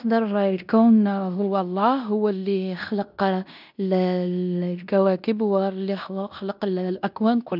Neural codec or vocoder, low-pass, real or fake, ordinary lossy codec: codec, 16 kHz in and 24 kHz out, 0.9 kbps, LongCat-Audio-Codec, fine tuned four codebook decoder; 5.4 kHz; fake; none